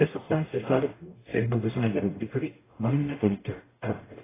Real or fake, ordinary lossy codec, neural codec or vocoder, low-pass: fake; AAC, 16 kbps; codec, 44.1 kHz, 0.9 kbps, DAC; 3.6 kHz